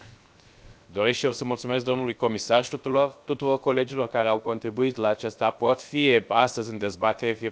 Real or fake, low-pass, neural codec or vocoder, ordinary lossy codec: fake; none; codec, 16 kHz, 0.7 kbps, FocalCodec; none